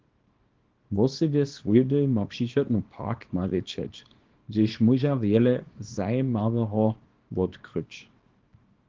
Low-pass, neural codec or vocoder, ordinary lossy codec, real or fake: 7.2 kHz; codec, 24 kHz, 0.9 kbps, WavTokenizer, small release; Opus, 16 kbps; fake